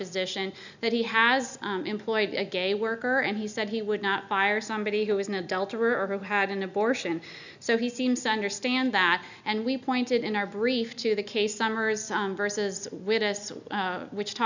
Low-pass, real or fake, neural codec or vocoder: 7.2 kHz; real; none